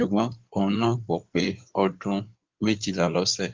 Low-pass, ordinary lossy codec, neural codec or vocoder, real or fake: 7.2 kHz; Opus, 32 kbps; codec, 16 kHz in and 24 kHz out, 2.2 kbps, FireRedTTS-2 codec; fake